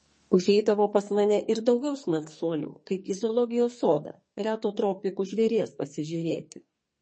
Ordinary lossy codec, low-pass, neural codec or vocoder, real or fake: MP3, 32 kbps; 9.9 kHz; codec, 44.1 kHz, 2.6 kbps, SNAC; fake